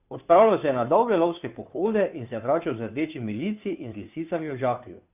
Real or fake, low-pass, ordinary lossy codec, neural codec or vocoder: fake; 3.6 kHz; none; codec, 16 kHz, 2 kbps, FunCodec, trained on Chinese and English, 25 frames a second